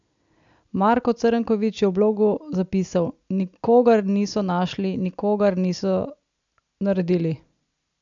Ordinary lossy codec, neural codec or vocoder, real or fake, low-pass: none; none; real; 7.2 kHz